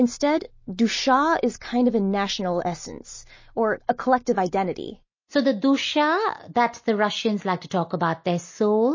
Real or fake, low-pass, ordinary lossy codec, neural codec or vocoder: real; 7.2 kHz; MP3, 32 kbps; none